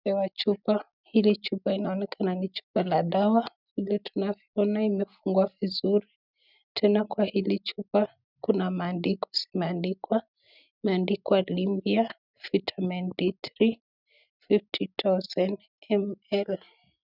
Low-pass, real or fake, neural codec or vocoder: 5.4 kHz; real; none